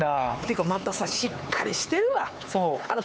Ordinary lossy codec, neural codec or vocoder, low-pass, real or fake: none; codec, 16 kHz, 4 kbps, X-Codec, HuBERT features, trained on LibriSpeech; none; fake